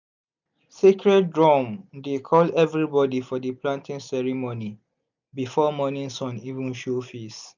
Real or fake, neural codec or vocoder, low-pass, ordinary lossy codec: real; none; 7.2 kHz; none